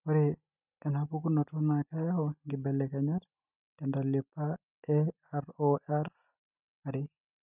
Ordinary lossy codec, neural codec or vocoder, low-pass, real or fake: none; none; 3.6 kHz; real